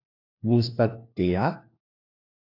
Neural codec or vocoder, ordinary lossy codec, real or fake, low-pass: codec, 16 kHz, 1 kbps, FunCodec, trained on LibriTTS, 50 frames a second; AAC, 32 kbps; fake; 5.4 kHz